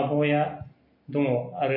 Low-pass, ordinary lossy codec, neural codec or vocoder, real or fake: 5.4 kHz; MP3, 24 kbps; none; real